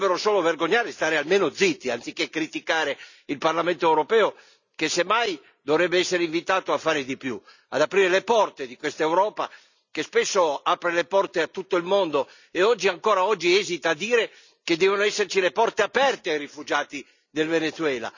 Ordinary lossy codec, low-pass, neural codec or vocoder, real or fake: none; 7.2 kHz; none; real